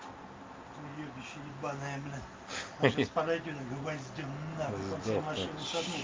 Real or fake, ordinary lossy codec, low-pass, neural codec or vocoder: real; Opus, 32 kbps; 7.2 kHz; none